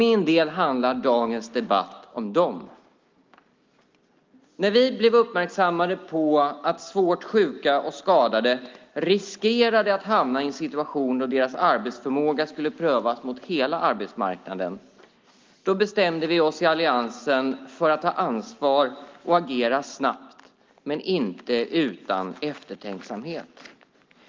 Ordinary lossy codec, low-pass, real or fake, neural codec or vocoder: Opus, 32 kbps; 7.2 kHz; real; none